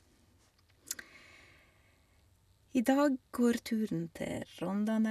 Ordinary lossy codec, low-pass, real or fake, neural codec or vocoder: none; 14.4 kHz; real; none